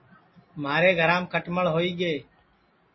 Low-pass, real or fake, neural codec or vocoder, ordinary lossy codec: 7.2 kHz; real; none; MP3, 24 kbps